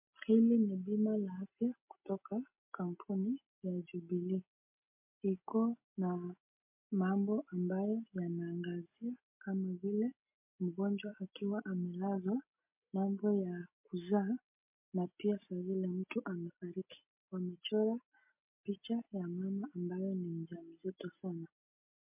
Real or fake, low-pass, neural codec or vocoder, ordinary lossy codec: real; 3.6 kHz; none; MP3, 32 kbps